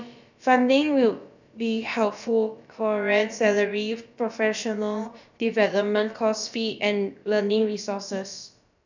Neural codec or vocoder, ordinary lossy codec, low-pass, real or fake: codec, 16 kHz, about 1 kbps, DyCAST, with the encoder's durations; none; 7.2 kHz; fake